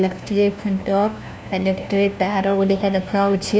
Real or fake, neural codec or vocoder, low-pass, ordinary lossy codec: fake; codec, 16 kHz, 1 kbps, FunCodec, trained on LibriTTS, 50 frames a second; none; none